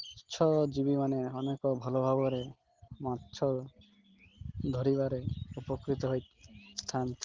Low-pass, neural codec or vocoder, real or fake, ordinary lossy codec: 7.2 kHz; none; real; Opus, 16 kbps